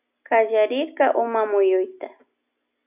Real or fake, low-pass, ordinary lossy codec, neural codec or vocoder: real; 3.6 kHz; AAC, 32 kbps; none